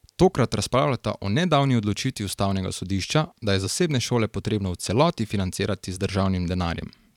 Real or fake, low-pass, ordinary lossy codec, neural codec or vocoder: fake; 19.8 kHz; none; vocoder, 44.1 kHz, 128 mel bands every 512 samples, BigVGAN v2